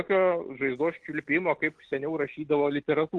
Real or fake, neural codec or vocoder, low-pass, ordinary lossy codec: real; none; 10.8 kHz; Opus, 16 kbps